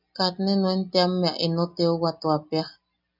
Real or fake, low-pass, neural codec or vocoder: real; 5.4 kHz; none